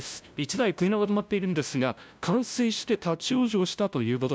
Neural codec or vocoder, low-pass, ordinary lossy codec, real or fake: codec, 16 kHz, 0.5 kbps, FunCodec, trained on LibriTTS, 25 frames a second; none; none; fake